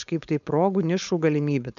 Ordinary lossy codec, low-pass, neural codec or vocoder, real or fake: MP3, 64 kbps; 7.2 kHz; codec, 16 kHz, 4.8 kbps, FACodec; fake